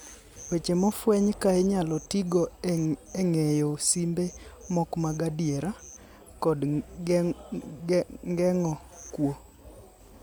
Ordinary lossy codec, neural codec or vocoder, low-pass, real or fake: none; none; none; real